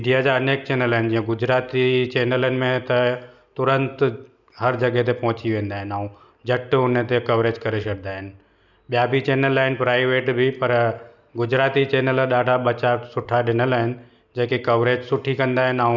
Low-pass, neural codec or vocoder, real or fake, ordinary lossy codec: 7.2 kHz; none; real; none